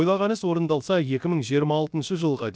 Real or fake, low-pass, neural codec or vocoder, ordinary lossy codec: fake; none; codec, 16 kHz, 0.7 kbps, FocalCodec; none